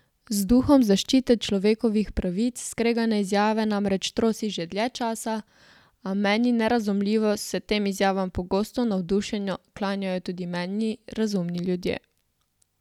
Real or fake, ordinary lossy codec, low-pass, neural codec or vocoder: real; none; 19.8 kHz; none